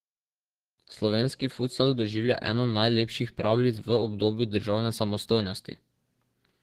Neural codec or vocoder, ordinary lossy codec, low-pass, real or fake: codec, 32 kHz, 1.9 kbps, SNAC; Opus, 16 kbps; 14.4 kHz; fake